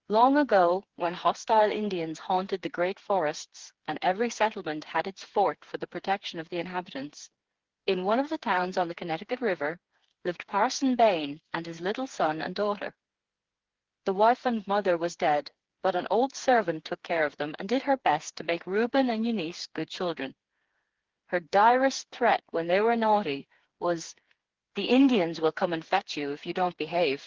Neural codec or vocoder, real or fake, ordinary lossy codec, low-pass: codec, 16 kHz, 4 kbps, FreqCodec, smaller model; fake; Opus, 16 kbps; 7.2 kHz